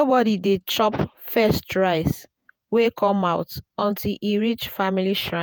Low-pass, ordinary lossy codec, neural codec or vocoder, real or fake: none; none; vocoder, 48 kHz, 128 mel bands, Vocos; fake